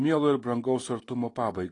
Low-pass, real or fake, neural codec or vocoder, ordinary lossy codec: 10.8 kHz; real; none; AAC, 32 kbps